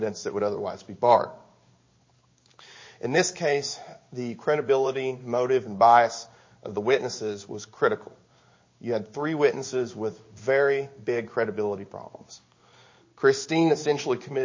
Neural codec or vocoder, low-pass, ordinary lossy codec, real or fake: codec, 16 kHz in and 24 kHz out, 1 kbps, XY-Tokenizer; 7.2 kHz; MP3, 32 kbps; fake